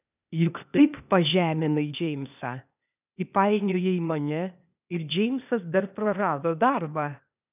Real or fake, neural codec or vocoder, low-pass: fake; codec, 16 kHz, 0.8 kbps, ZipCodec; 3.6 kHz